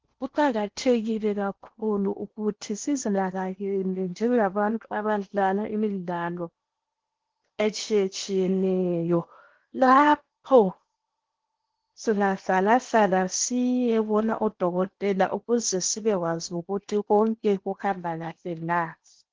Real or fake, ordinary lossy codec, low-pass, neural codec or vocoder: fake; Opus, 16 kbps; 7.2 kHz; codec, 16 kHz in and 24 kHz out, 0.8 kbps, FocalCodec, streaming, 65536 codes